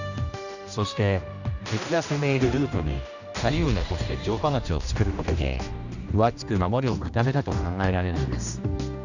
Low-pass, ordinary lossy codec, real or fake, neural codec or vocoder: 7.2 kHz; none; fake; codec, 16 kHz, 1 kbps, X-Codec, HuBERT features, trained on general audio